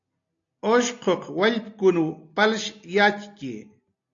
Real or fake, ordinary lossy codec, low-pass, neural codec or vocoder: real; AAC, 48 kbps; 7.2 kHz; none